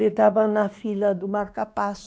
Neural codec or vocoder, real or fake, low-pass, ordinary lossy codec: codec, 16 kHz, 2 kbps, X-Codec, WavLM features, trained on Multilingual LibriSpeech; fake; none; none